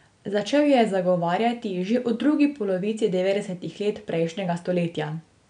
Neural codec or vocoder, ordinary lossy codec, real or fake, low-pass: none; none; real; 9.9 kHz